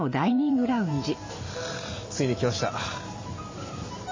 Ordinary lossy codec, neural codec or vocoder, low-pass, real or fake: MP3, 32 kbps; vocoder, 44.1 kHz, 128 mel bands every 512 samples, BigVGAN v2; 7.2 kHz; fake